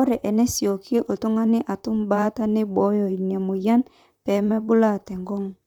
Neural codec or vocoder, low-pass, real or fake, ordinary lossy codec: vocoder, 44.1 kHz, 128 mel bands, Pupu-Vocoder; 19.8 kHz; fake; none